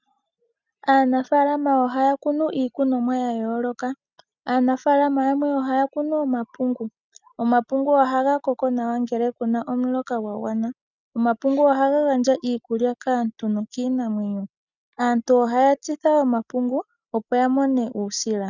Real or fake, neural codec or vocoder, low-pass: real; none; 7.2 kHz